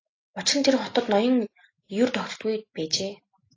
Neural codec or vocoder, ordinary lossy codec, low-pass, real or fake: none; AAC, 32 kbps; 7.2 kHz; real